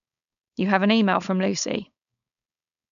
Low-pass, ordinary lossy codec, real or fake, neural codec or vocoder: 7.2 kHz; none; fake; codec, 16 kHz, 4.8 kbps, FACodec